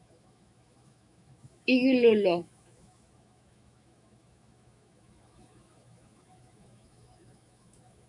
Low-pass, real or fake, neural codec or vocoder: 10.8 kHz; fake; autoencoder, 48 kHz, 128 numbers a frame, DAC-VAE, trained on Japanese speech